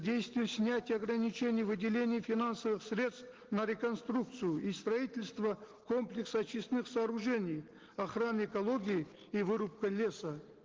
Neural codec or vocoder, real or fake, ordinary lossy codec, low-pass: none; real; Opus, 16 kbps; 7.2 kHz